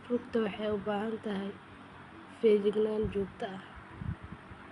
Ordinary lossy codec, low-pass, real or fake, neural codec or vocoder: Opus, 64 kbps; 10.8 kHz; real; none